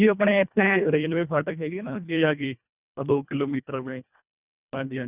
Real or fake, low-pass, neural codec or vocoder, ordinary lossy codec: fake; 3.6 kHz; codec, 24 kHz, 1.5 kbps, HILCodec; Opus, 64 kbps